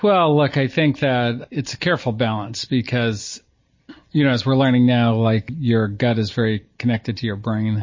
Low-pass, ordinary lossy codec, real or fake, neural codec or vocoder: 7.2 kHz; MP3, 32 kbps; real; none